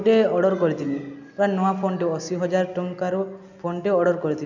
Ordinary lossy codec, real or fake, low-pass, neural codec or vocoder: none; real; 7.2 kHz; none